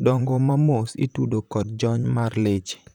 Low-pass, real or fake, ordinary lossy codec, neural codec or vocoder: 19.8 kHz; fake; none; vocoder, 44.1 kHz, 128 mel bands, Pupu-Vocoder